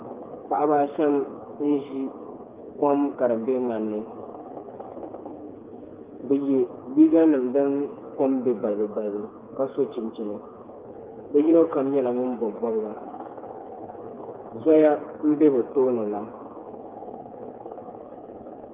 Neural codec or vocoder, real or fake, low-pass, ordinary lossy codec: codec, 16 kHz, 4 kbps, FreqCodec, smaller model; fake; 3.6 kHz; Opus, 24 kbps